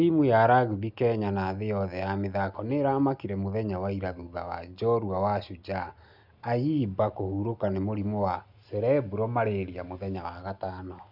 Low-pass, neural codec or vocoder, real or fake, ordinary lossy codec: 5.4 kHz; none; real; none